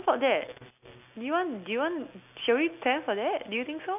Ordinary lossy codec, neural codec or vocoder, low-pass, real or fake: none; none; 3.6 kHz; real